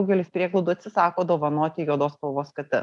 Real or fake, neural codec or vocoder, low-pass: real; none; 10.8 kHz